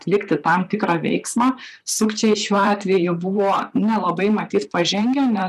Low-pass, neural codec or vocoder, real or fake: 14.4 kHz; vocoder, 44.1 kHz, 128 mel bands, Pupu-Vocoder; fake